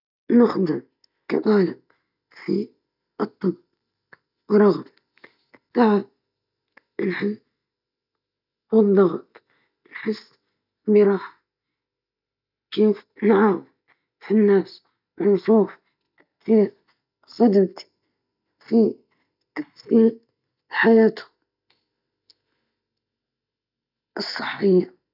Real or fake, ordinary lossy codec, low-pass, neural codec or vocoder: real; none; 5.4 kHz; none